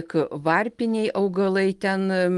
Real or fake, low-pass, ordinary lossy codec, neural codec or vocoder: real; 10.8 kHz; Opus, 24 kbps; none